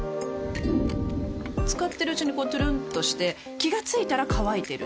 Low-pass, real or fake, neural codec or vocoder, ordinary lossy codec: none; real; none; none